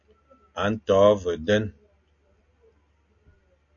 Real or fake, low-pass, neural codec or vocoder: real; 7.2 kHz; none